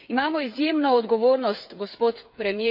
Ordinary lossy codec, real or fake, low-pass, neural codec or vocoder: none; fake; 5.4 kHz; codec, 16 kHz, 8 kbps, FreqCodec, smaller model